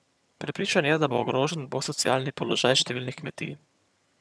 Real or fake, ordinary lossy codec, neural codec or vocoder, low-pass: fake; none; vocoder, 22.05 kHz, 80 mel bands, HiFi-GAN; none